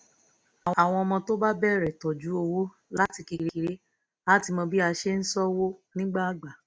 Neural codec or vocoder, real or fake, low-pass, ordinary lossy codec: none; real; none; none